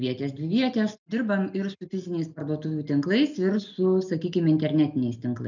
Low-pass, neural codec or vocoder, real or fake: 7.2 kHz; none; real